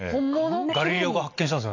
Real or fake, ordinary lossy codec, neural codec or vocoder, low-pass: real; AAC, 48 kbps; none; 7.2 kHz